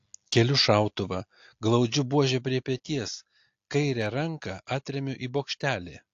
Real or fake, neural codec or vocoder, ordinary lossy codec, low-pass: real; none; AAC, 48 kbps; 7.2 kHz